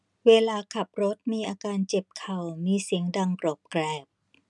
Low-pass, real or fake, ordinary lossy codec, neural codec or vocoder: 10.8 kHz; real; none; none